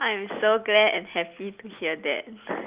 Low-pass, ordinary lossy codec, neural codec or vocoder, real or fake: 3.6 kHz; Opus, 24 kbps; none; real